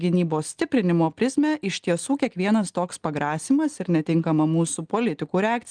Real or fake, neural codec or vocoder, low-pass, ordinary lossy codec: real; none; 9.9 kHz; Opus, 24 kbps